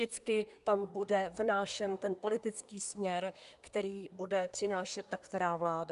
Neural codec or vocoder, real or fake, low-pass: codec, 24 kHz, 1 kbps, SNAC; fake; 10.8 kHz